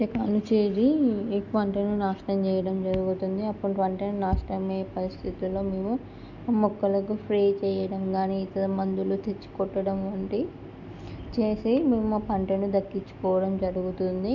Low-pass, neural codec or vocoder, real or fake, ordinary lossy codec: 7.2 kHz; none; real; none